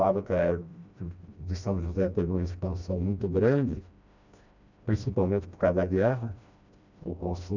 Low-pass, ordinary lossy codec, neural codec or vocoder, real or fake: 7.2 kHz; none; codec, 16 kHz, 1 kbps, FreqCodec, smaller model; fake